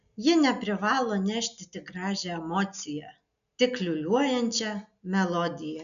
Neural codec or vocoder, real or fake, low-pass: none; real; 7.2 kHz